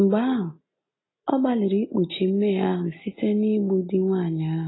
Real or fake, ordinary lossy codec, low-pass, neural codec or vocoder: real; AAC, 16 kbps; 7.2 kHz; none